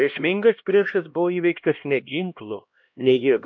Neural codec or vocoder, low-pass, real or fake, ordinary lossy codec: codec, 16 kHz, 1 kbps, X-Codec, HuBERT features, trained on LibriSpeech; 7.2 kHz; fake; MP3, 48 kbps